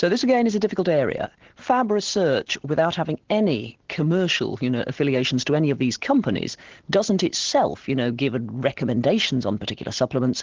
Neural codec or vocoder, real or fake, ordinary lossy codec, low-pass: none; real; Opus, 16 kbps; 7.2 kHz